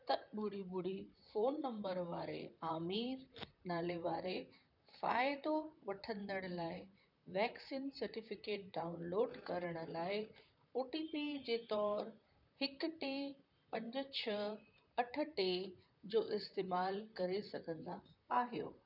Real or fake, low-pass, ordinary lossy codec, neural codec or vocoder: fake; 5.4 kHz; none; vocoder, 44.1 kHz, 128 mel bands, Pupu-Vocoder